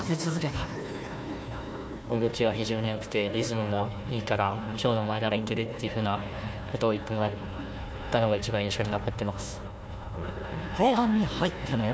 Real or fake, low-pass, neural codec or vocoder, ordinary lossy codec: fake; none; codec, 16 kHz, 1 kbps, FunCodec, trained on Chinese and English, 50 frames a second; none